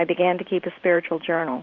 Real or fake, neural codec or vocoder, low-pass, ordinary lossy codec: fake; vocoder, 44.1 kHz, 128 mel bands every 512 samples, BigVGAN v2; 7.2 kHz; Opus, 64 kbps